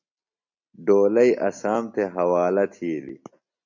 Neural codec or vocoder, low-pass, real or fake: none; 7.2 kHz; real